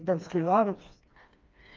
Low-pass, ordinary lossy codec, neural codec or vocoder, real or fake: 7.2 kHz; Opus, 24 kbps; codec, 16 kHz, 2 kbps, FreqCodec, smaller model; fake